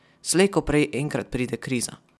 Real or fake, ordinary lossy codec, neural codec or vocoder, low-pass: real; none; none; none